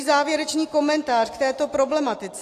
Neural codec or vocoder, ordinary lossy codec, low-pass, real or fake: none; AAC, 48 kbps; 14.4 kHz; real